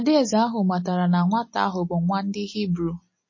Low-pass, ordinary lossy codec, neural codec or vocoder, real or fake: 7.2 kHz; MP3, 32 kbps; none; real